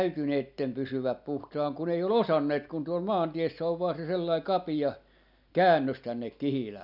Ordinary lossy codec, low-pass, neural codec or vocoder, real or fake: none; 5.4 kHz; none; real